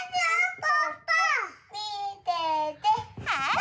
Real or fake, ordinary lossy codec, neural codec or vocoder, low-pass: real; none; none; none